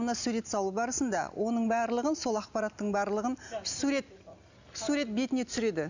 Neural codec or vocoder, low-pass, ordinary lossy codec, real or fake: none; 7.2 kHz; none; real